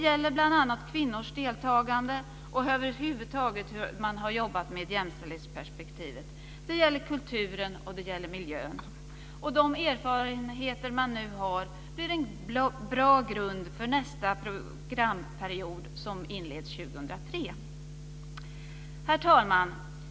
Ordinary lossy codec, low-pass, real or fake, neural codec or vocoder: none; none; real; none